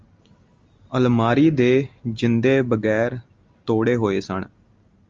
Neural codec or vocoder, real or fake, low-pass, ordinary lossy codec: none; real; 7.2 kHz; Opus, 32 kbps